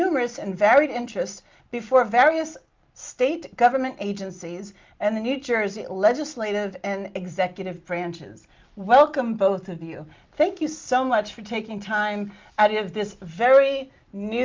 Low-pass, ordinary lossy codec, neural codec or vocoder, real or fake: 7.2 kHz; Opus, 24 kbps; none; real